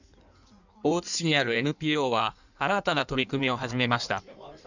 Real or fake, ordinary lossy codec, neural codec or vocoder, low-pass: fake; none; codec, 16 kHz in and 24 kHz out, 1.1 kbps, FireRedTTS-2 codec; 7.2 kHz